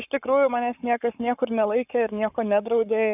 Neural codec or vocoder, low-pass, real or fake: codec, 44.1 kHz, 7.8 kbps, Pupu-Codec; 3.6 kHz; fake